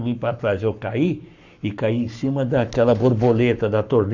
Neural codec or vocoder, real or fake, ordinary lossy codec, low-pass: codec, 44.1 kHz, 7.8 kbps, Pupu-Codec; fake; none; 7.2 kHz